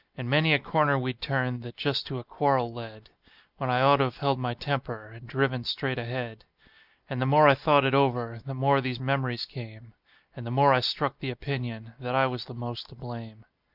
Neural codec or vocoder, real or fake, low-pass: none; real; 5.4 kHz